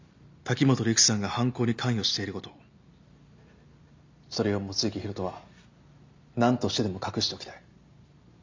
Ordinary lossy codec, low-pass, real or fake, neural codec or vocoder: MP3, 64 kbps; 7.2 kHz; real; none